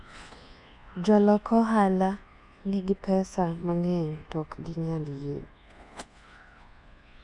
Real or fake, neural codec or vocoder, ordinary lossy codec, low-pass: fake; codec, 24 kHz, 1.2 kbps, DualCodec; none; 10.8 kHz